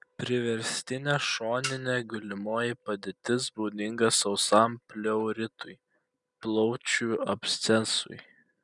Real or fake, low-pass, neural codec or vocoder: real; 10.8 kHz; none